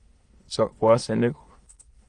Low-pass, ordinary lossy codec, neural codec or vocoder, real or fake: 9.9 kHz; Opus, 24 kbps; autoencoder, 22.05 kHz, a latent of 192 numbers a frame, VITS, trained on many speakers; fake